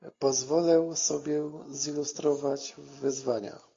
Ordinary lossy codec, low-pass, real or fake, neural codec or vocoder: AAC, 32 kbps; 7.2 kHz; real; none